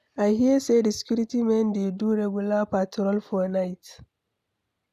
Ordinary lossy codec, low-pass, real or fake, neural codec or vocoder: none; 14.4 kHz; real; none